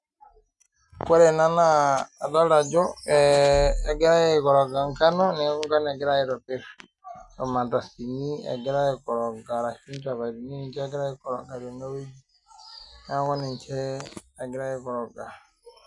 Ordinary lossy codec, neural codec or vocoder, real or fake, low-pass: none; none; real; 10.8 kHz